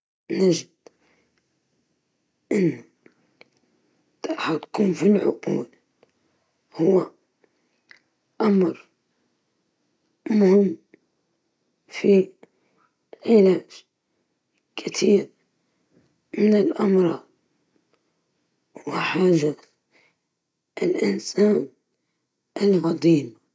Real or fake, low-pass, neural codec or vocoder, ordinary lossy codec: real; none; none; none